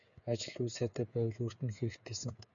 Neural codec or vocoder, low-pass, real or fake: codec, 16 kHz, 8 kbps, FreqCodec, smaller model; 7.2 kHz; fake